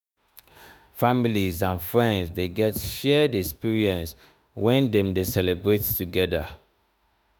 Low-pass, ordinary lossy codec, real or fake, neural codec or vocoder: none; none; fake; autoencoder, 48 kHz, 32 numbers a frame, DAC-VAE, trained on Japanese speech